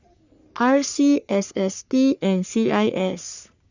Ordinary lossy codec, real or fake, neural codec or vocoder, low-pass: Opus, 64 kbps; fake; codec, 44.1 kHz, 3.4 kbps, Pupu-Codec; 7.2 kHz